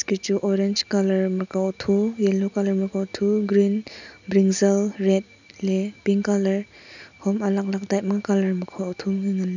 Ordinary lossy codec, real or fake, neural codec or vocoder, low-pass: none; real; none; 7.2 kHz